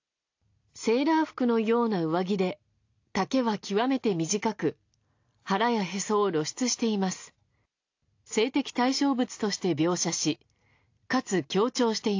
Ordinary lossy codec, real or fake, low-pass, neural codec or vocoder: AAC, 48 kbps; real; 7.2 kHz; none